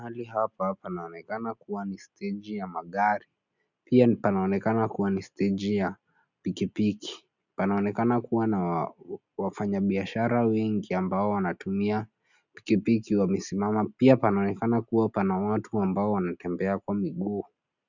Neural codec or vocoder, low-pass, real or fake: none; 7.2 kHz; real